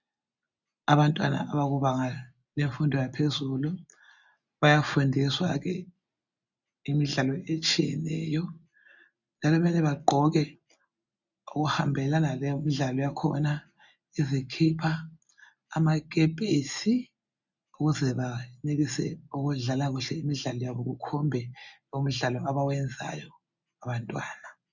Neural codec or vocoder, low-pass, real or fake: none; 7.2 kHz; real